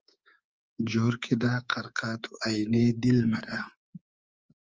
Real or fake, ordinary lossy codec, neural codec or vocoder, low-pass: fake; Opus, 24 kbps; codec, 24 kHz, 3.1 kbps, DualCodec; 7.2 kHz